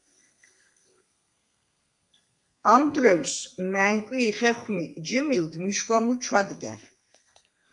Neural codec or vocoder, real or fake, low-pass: codec, 32 kHz, 1.9 kbps, SNAC; fake; 10.8 kHz